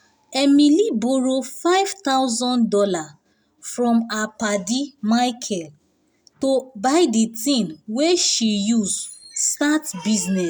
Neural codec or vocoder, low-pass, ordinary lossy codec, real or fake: none; none; none; real